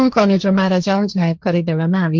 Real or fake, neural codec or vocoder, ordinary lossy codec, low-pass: fake; codec, 16 kHz, 1.1 kbps, Voila-Tokenizer; Opus, 24 kbps; 7.2 kHz